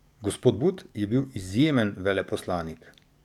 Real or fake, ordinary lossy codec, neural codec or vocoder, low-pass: fake; none; codec, 44.1 kHz, 7.8 kbps, DAC; 19.8 kHz